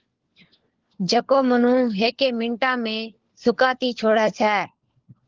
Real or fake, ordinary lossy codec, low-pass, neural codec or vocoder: fake; Opus, 16 kbps; 7.2 kHz; codec, 16 kHz, 4 kbps, FunCodec, trained on LibriTTS, 50 frames a second